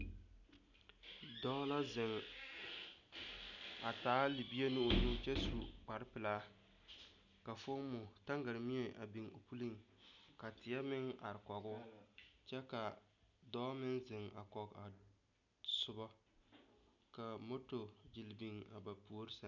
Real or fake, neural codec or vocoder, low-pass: real; none; 7.2 kHz